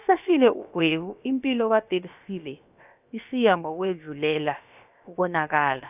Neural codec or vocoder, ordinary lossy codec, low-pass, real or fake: codec, 16 kHz, about 1 kbps, DyCAST, with the encoder's durations; none; 3.6 kHz; fake